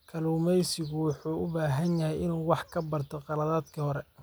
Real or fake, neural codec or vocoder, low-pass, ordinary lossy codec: real; none; none; none